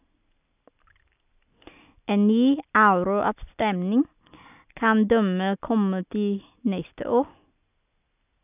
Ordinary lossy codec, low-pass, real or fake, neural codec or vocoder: none; 3.6 kHz; real; none